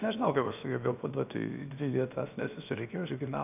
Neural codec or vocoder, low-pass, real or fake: codec, 16 kHz, about 1 kbps, DyCAST, with the encoder's durations; 3.6 kHz; fake